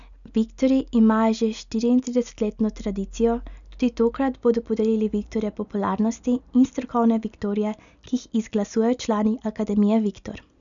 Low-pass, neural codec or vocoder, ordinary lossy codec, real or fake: 7.2 kHz; none; none; real